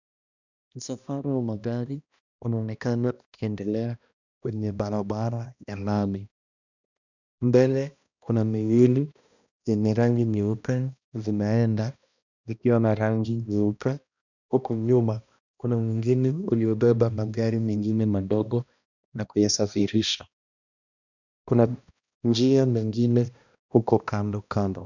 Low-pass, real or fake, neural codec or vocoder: 7.2 kHz; fake; codec, 16 kHz, 1 kbps, X-Codec, HuBERT features, trained on balanced general audio